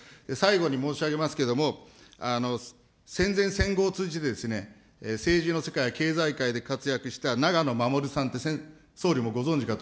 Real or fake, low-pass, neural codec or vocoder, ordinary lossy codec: real; none; none; none